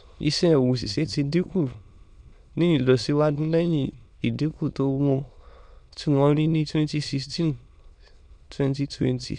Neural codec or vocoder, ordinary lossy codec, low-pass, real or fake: autoencoder, 22.05 kHz, a latent of 192 numbers a frame, VITS, trained on many speakers; none; 9.9 kHz; fake